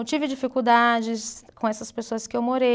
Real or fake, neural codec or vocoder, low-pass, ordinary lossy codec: real; none; none; none